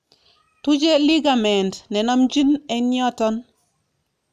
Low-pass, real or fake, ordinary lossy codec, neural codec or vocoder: 14.4 kHz; real; none; none